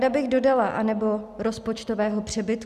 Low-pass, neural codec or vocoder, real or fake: 14.4 kHz; none; real